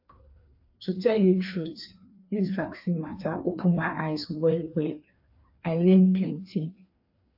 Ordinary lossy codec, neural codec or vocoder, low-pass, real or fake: AAC, 48 kbps; codec, 16 kHz, 2 kbps, FreqCodec, larger model; 5.4 kHz; fake